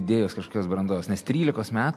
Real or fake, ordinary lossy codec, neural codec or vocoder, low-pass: real; MP3, 64 kbps; none; 14.4 kHz